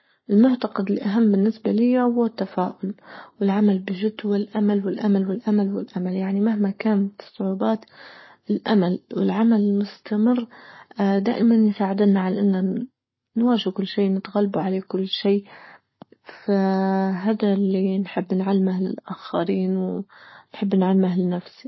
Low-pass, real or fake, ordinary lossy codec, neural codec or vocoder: 7.2 kHz; fake; MP3, 24 kbps; codec, 44.1 kHz, 7.8 kbps, Pupu-Codec